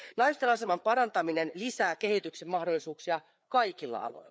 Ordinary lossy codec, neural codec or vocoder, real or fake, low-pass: none; codec, 16 kHz, 4 kbps, FreqCodec, larger model; fake; none